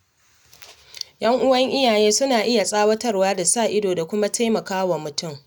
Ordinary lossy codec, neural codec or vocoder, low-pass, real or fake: none; none; none; real